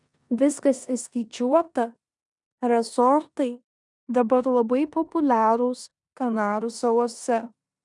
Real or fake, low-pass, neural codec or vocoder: fake; 10.8 kHz; codec, 16 kHz in and 24 kHz out, 0.9 kbps, LongCat-Audio-Codec, four codebook decoder